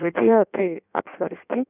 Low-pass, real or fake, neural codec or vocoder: 3.6 kHz; fake; codec, 16 kHz in and 24 kHz out, 1.1 kbps, FireRedTTS-2 codec